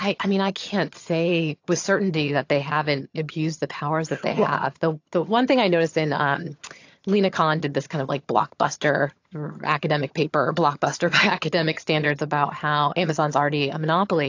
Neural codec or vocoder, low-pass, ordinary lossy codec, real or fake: vocoder, 22.05 kHz, 80 mel bands, HiFi-GAN; 7.2 kHz; AAC, 48 kbps; fake